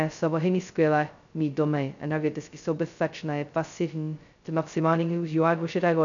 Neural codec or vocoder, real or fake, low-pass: codec, 16 kHz, 0.2 kbps, FocalCodec; fake; 7.2 kHz